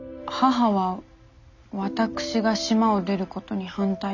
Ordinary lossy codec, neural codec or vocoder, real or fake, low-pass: none; none; real; 7.2 kHz